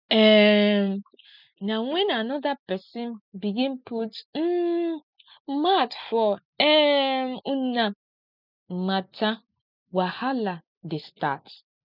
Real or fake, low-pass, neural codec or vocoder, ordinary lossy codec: real; 5.4 kHz; none; none